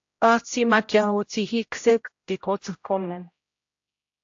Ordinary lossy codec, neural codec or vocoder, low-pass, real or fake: AAC, 48 kbps; codec, 16 kHz, 0.5 kbps, X-Codec, HuBERT features, trained on general audio; 7.2 kHz; fake